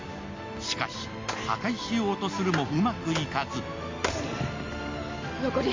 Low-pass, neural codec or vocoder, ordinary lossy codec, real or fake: 7.2 kHz; none; MP3, 48 kbps; real